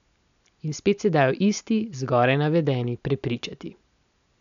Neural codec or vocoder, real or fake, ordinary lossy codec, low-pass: none; real; none; 7.2 kHz